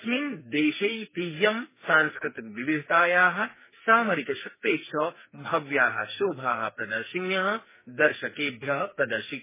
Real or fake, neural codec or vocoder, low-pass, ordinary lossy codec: fake; codec, 44.1 kHz, 2.6 kbps, SNAC; 3.6 kHz; MP3, 16 kbps